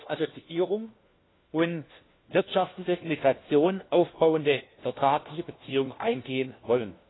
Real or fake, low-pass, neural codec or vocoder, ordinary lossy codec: fake; 7.2 kHz; codec, 16 kHz, 1 kbps, FunCodec, trained on LibriTTS, 50 frames a second; AAC, 16 kbps